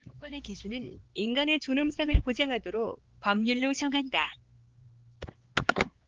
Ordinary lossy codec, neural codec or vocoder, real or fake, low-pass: Opus, 16 kbps; codec, 16 kHz, 4 kbps, X-Codec, HuBERT features, trained on LibriSpeech; fake; 7.2 kHz